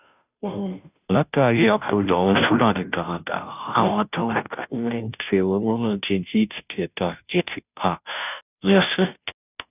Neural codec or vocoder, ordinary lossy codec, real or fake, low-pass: codec, 16 kHz, 0.5 kbps, FunCodec, trained on Chinese and English, 25 frames a second; none; fake; 3.6 kHz